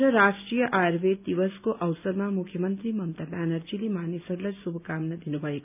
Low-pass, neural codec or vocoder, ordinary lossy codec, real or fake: 3.6 kHz; none; none; real